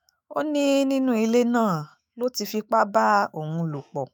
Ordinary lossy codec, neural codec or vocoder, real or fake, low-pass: none; autoencoder, 48 kHz, 128 numbers a frame, DAC-VAE, trained on Japanese speech; fake; none